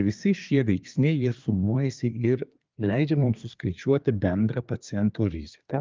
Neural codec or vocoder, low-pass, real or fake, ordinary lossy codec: codec, 24 kHz, 1 kbps, SNAC; 7.2 kHz; fake; Opus, 24 kbps